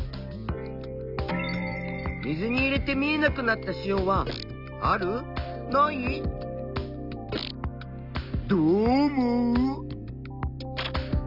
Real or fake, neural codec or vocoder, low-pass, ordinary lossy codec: real; none; 5.4 kHz; none